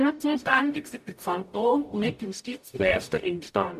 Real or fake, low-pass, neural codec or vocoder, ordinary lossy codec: fake; 14.4 kHz; codec, 44.1 kHz, 0.9 kbps, DAC; MP3, 96 kbps